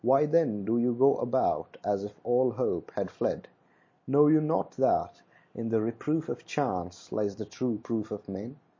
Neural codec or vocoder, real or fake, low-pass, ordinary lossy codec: none; real; 7.2 kHz; MP3, 32 kbps